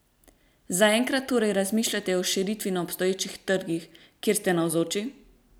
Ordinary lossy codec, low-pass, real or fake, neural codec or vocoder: none; none; real; none